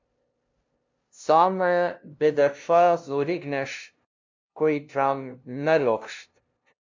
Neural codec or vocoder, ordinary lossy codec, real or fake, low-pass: codec, 16 kHz, 0.5 kbps, FunCodec, trained on LibriTTS, 25 frames a second; MP3, 64 kbps; fake; 7.2 kHz